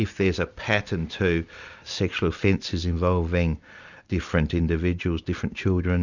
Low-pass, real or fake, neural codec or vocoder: 7.2 kHz; real; none